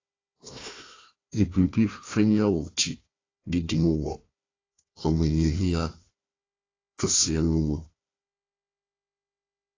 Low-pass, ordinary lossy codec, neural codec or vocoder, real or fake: 7.2 kHz; AAC, 32 kbps; codec, 16 kHz, 1 kbps, FunCodec, trained on Chinese and English, 50 frames a second; fake